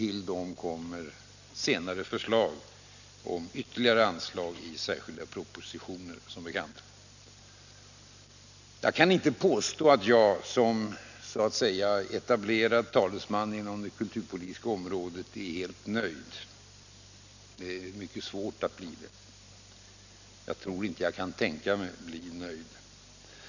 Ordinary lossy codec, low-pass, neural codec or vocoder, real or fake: none; 7.2 kHz; none; real